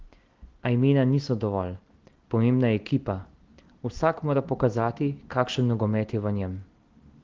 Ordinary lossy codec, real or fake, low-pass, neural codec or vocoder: Opus, 16 kbps; real; 7.2 kHz; none